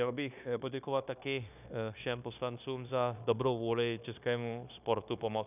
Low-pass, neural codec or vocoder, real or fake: 3.6 kHz; codec, 16 kHz, 0.9 kbps, LongCat-Audio-Codec; fake